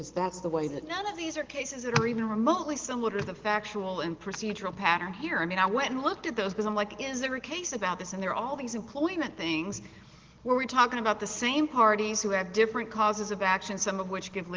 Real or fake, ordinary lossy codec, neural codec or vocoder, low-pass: real; Opus, 16 kbps; none; 7.2 kHz